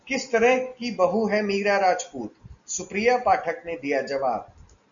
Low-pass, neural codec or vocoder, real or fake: 7.2 kHz; none; real